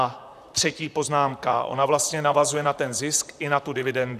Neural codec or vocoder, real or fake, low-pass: vocoder, 44.1 kHz, 128 mel bands, Pupu-Vocoder; fake; 14.4 kHz